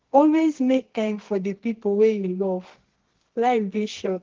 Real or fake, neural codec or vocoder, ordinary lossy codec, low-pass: fake; codec, 24 kHz, 0.9 kbps, WavTokenizer, medium music audio release; Opus, 16 kbps; 7.2 kHz